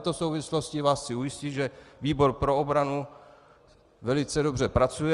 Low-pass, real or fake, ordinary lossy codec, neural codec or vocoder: 10.8 kHz; real; Opus, 32 kbps; none